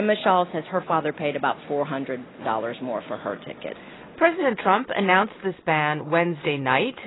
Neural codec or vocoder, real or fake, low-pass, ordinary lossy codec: none; real; 7.2 kHz; AAC, 16 kbps